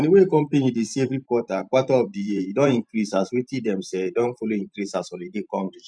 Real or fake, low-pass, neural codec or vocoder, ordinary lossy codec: fake; 9.9 kHz; vocoder, 44.1 kHz, 128 mel bands every 512 samples, BigVGAN v2; none